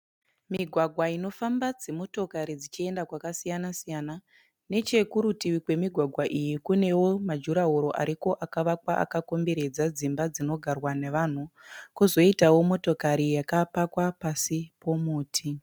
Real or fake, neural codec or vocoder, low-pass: real; none; 19.8 kHz